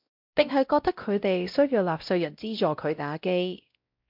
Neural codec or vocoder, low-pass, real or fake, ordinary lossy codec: codec, 16 kHz, 0.5 kbps, X-Codec, WavLM features, trained on Multilingual LibriSpeech; 5.4 kHz; fake; MP3, 48 kbps